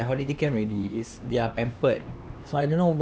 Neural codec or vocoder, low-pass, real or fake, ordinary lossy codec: codec, 16 kHz, 2 kbps, X-Codec, HuBERT features, trained on LibriSpeech; none; fake; none